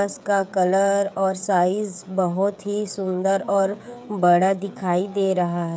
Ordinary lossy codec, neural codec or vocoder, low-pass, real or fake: none; codec, 16 kHz, 16 kbps, FreqCodec, smaller model; none; fake